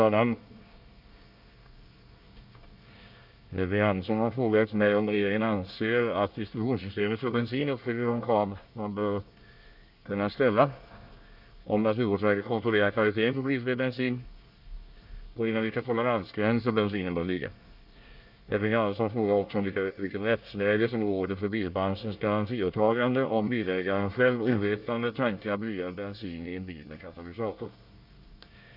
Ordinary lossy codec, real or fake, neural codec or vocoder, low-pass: none; fake; codec, 24 kHz, 1 kbps, SNAC; 5.4 kHz